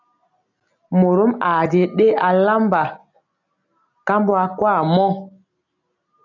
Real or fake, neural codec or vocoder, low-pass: real; none; 7.2 kHz